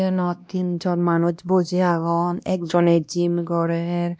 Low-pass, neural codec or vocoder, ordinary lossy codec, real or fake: none; codec, 16 kHz, 1 kbps, X-Codec, WavLM features, trained on Multilingual LibriSpeech; none; fake